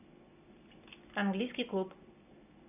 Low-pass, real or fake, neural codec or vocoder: 3.6 kHz; real; none